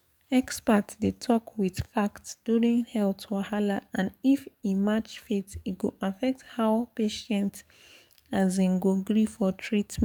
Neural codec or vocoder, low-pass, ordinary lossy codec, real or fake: codec, 44.1 kHz, 7.8 kbps, DAC; 19.8 kHz; none; fake